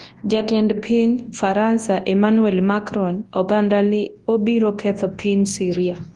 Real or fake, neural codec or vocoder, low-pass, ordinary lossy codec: fake; codec, 24 kHz, 0.9 kbps, WavTokenizer, large speech release; 10.8 kHz; Opus, 24 kbps